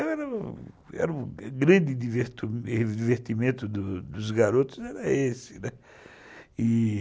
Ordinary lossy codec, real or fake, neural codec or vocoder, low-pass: none; real; none; none